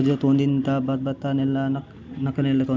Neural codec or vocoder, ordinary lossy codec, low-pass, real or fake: none; none; none; real